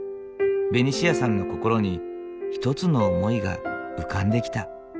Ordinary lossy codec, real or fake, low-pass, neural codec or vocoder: none; real; none; none